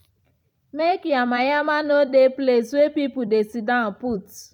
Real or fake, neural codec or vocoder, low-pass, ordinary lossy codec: fake; vocoder, 44.1 kHz, 128 mel bands every 256 samples, BigVGAN v2; 19.8 kHz; none